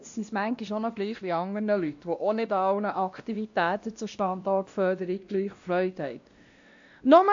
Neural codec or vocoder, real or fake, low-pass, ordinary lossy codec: codec, 16 kHz, 1 kbps, X-Codec, WavLM features, trained on Multilingual LibriSpeech; fake; 7.2 kHz; AAC, 64 kbps